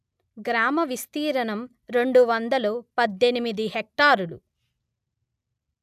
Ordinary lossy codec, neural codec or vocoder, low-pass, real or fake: none; none; 14.4 kHz; real